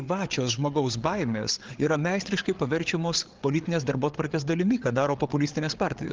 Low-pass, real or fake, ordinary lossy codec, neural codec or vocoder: 7.2 kHz; fake; Opus, 16 kbps; codec, 16 kHz, 8 kbps, FreqCodec, larger model